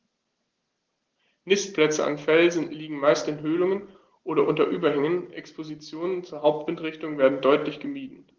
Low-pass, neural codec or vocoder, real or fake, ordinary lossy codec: 7.2 kHz; none; real; Opus, 16 kbps